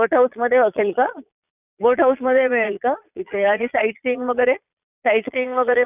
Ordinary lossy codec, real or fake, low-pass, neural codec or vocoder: none; fake; 3.6 kHz; vocoder, 22.05 kHz, 80 mel bands, Vocos